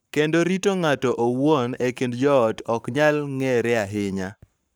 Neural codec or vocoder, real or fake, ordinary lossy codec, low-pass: codec, 44.1 kHz, 7.8 kbps, Pupu-Codec; fake; none; none